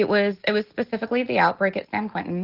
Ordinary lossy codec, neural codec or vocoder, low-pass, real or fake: Opus, 16 kbps; none; 5.4 kHz; real